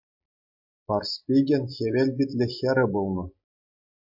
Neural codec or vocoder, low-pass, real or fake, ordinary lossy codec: none; 5.4 kHz; real; MP3, 48 kbps